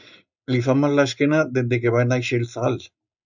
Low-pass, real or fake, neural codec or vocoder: 7.2 kHz; real; none